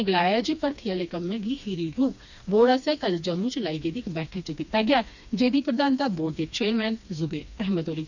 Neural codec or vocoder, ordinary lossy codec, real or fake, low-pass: codec, 16 kHz, 2 kbps, FreqCodec, smaller model; none; fake; 7.2 kHz